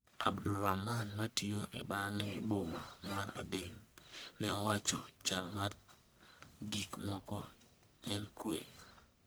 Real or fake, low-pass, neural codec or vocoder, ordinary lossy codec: fake; none; codec, 44.1 kHz, 1.7 kbps, Pupu-Codec; none